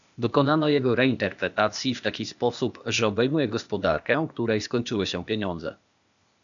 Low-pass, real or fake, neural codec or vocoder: 7.2 kHz; fake; codec, 16 kHz, 0.8 kbps, ZipCodec